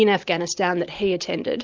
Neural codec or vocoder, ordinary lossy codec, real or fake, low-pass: none; Opus, 32 kbps; real; 7.2 kHz